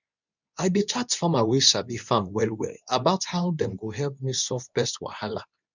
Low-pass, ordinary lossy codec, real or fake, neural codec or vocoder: 7.2 kHz; none; fake; codec, 24 kHz, 0.9 kbps, WavTokenizer, medium speech release version 2